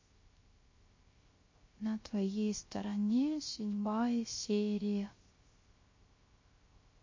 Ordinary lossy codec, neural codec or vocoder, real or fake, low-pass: MP3, 32 kbps; codec, 16 kHz, 0.3 kbps, FocalCodec; fake; 7.2 kHz